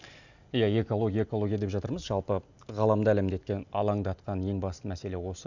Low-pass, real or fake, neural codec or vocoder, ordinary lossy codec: 7.2 kHz; real; none; none